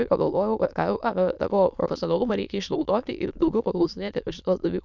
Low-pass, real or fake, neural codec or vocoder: 7.2 kHz; fake; autoencoder, 22.05 kHz, a latent of 192 numbers a frame, VITS, trained on many speakers